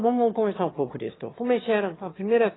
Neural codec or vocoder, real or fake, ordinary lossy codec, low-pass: autoencoder, 22.05 kHz, a latent of 192 numbers a frame, VITS, trained on one speaker; fake; AAC, 16 kbps; 7.2 kHz